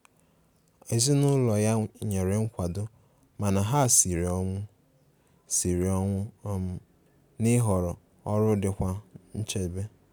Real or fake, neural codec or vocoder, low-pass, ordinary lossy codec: real; none; none; none